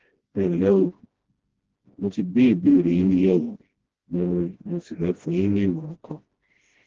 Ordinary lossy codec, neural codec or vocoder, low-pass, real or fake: Opus, 16 kbps; codec, 16 kHz, 1 kbps, FreqCodec, smaller model; 7.2 kHz; fake